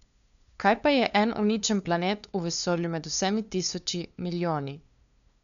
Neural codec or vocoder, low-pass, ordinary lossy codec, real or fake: codec, 16 kHz, 2 kbps, FunCodec, trained on LibriTTS, 25 frames a second; 7.2 kHz; none; fake